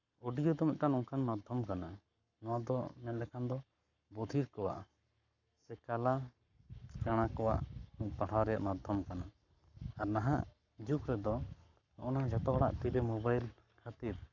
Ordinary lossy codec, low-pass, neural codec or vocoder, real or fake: none; 7.2 kHz; codec, 44.1 kHz, 7.8 kbps, Pupu-Codec; fake